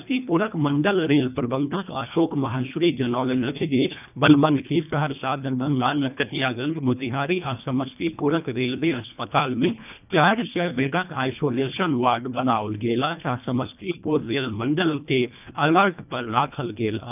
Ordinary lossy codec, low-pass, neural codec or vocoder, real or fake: none; 3.6 kHz; codec, 24 kHz, 1.5 kbps, HILCodec; fake